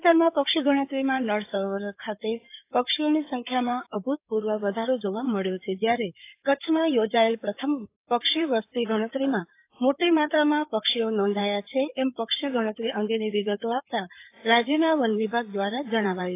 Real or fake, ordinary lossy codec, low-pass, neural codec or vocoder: fake; AAC, 24 kbps; 3.6 kHz; codec, 16 kHz in and 24 kHz out, 2.2 kbps, FireRedTTS-2 codec